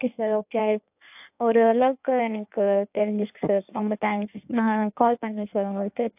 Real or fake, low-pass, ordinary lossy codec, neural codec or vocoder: fake; 3.6 kHz; AAC, 32 kbps; codec, 16 kHz in and 24 kHz out, 1.1 kbps, FireRedTTS-2 codec